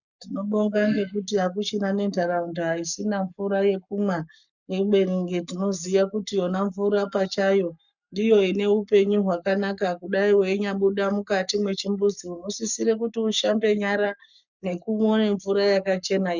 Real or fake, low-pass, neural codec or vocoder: fake; 7.2 kHz; codec, 44.1 kHz, 7.8 kbps, Pupu-Codec